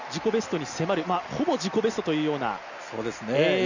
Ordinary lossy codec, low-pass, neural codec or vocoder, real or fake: none; 7.2 kHz; none; real